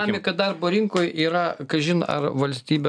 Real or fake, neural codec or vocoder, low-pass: real; none; 9.9 kHz